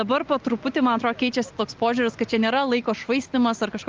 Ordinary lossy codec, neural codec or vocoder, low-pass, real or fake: Opus, 32 kbps; none; 7.2 kHz; real